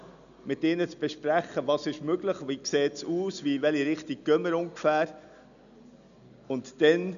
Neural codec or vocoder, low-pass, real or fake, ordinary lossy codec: none; 7.2 kHz; real; none